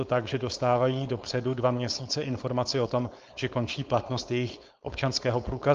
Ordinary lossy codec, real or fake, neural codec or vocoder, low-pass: Opus, 24 kbps; fake; codec, 16 kHz, 4.8 kbps, FACodec; 7.2 kHz